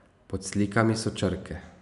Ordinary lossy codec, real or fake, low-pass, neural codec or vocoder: none; real; 10.8 kHz; none